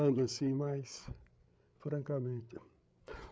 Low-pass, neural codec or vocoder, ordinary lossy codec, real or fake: none; codec, 16 kHz, 8 kbps, FreqCodec, larger model; none; fake